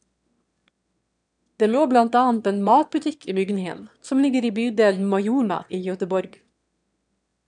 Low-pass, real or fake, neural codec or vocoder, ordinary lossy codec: 9.9 kHz; fake; autoencoder, 22.05 kHz, a latent of 192 numbers a frame, VITS, trained on one speaker; none